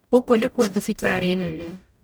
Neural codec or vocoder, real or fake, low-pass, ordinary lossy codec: codec, 44.1 kHz, 0.9 kbps, DAC; fake; none; none